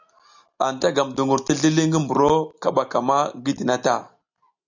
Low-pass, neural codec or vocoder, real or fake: 7.2 kHz; none; real